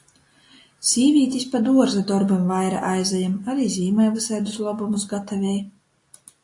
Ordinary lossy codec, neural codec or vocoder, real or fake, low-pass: AAC, 48 kbps; none; real; 10.8 kHz